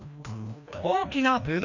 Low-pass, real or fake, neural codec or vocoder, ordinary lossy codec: 7.2 kHz; fake; codec, 16 kHz, 1 kbps, FreqCodec, larger model; none